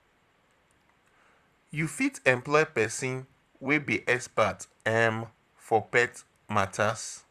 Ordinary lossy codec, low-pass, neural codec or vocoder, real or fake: Opus, 64 kbps; 14.4 kHz; vocoder, 44.1 kHz, 128 mel bands, Pupu-Vocoder; fake